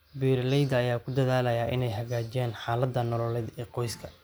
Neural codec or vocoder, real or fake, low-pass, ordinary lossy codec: none; real; none; none